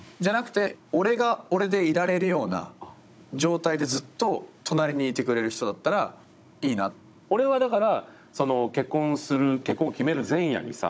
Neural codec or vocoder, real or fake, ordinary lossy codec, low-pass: codec, 16 kHz, 16 kbps, FunCodec, trained on Chinese and English, 50 frames a second; fake; none; none